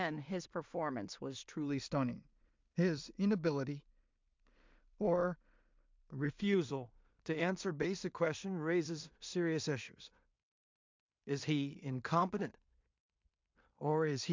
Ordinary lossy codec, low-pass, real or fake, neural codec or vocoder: MP3, 64 kbps; 7.2 kHz; fake; codec, 16 kHz in and 24 kHz out, 0.4 kbps, LongCat-Audio-Codec, two codebook decoder